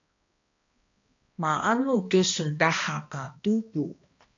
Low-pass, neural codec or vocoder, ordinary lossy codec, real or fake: 7.2 kHz; codec, 16 kHz, 1 kbps, X-Codec, HuBERT features, trained on balanced general audio; AAC, 48 kbps; fake